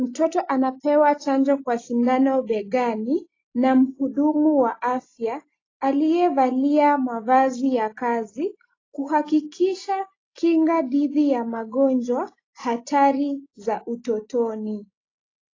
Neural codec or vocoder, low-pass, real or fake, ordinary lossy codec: none; 7.2 kHz; real; AAC, 32 kbps